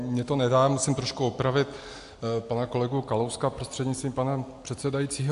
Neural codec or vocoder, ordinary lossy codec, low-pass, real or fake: none; AAC, 64 kbps; 10.8 kHz; real